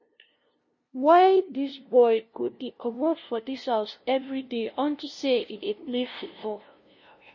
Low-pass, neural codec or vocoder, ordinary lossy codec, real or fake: 7.2 kHz; codec, 16 kHz, 0.5 kbps, FunCodec, trained on LibriTTS, 25 frames a second; MP3, 32 kbps; fake